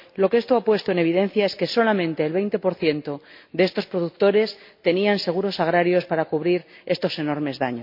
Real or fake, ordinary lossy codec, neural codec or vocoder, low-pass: real; MP3, 32 kbps; none; 5.4 kHz